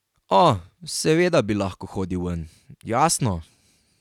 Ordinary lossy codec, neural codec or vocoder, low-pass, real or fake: none; none; 19.8 kHz; real